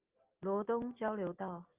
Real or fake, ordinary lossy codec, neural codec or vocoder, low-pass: real; Opus, 24 kbps; none; 3.6 kHz